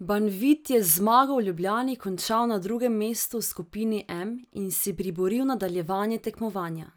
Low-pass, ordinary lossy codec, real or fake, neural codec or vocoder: none; none; real; none